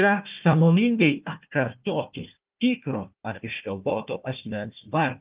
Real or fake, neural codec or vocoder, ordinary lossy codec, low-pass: fake; codec, 16 kHz, 1 kbps, FunCodec, trained on Chinese and English, 50 frames a second; Opus, 32 kbps; 3.6 kHz